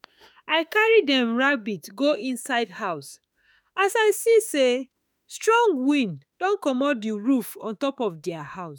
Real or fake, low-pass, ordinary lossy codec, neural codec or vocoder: fake; none; none; autoencoder, 48 kHz, 32 numbers a frame, DAC-VAE, trained on Japanese speech